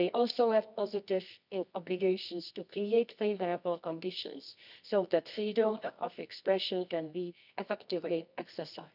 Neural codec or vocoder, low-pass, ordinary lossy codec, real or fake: codec, 24 kHz, 0.9 kbps, WavTokenizer, medium music audio release; 5.4 kHz; none; fake